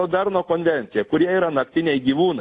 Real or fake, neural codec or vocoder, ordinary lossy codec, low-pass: real; none; AAC, 48 kbps; 10.8 kHz